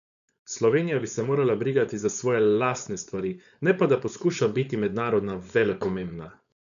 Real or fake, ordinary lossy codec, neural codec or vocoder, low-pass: fake; none; codec, 16 kHz, 4.8 kbps, FACodec; 7.2 kHz